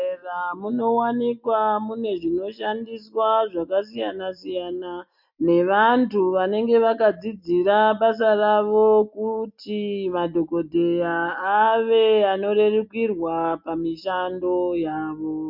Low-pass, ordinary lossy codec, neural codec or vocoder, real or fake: 5.4 kHz; MP3, 48 kbps; none; real